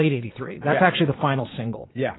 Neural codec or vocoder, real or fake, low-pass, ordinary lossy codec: vocoder, 22.05 kHz, 80 mel bands, Vocos; fake; 7.2 kHz; AAC, 16 kbps